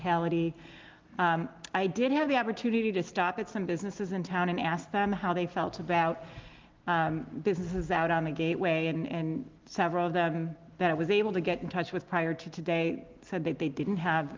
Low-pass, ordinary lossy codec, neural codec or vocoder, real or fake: 7.2 kHz; Opus, 32 kbps; none; real